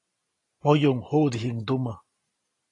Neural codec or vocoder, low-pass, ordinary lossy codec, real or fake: none; 10.8 kHz; AAC, 32 kbps; real